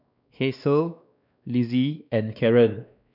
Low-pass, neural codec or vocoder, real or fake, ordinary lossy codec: 5.4 kHz; codec, 16 kHz, 2 kbps, X-Codec, WavLM features, trained on Multilingual LibriSpeech; fake; none